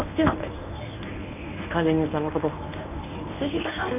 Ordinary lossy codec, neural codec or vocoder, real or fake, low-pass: none; codec, 24 kHz, 0.9 kbps, WavTokenizer, medium speech release version 1; fake; 3.6 kHz